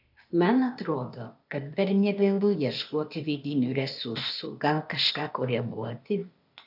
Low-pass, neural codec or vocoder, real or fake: 5.4 kHz; codec, 16 kHz, 0.8 kbps, ZipCodec; fake